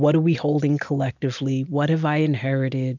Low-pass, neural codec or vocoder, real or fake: 7.2 kHz; none; real